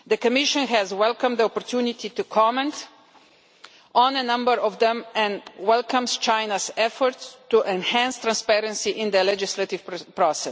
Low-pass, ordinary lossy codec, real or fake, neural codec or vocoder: none; none; real; none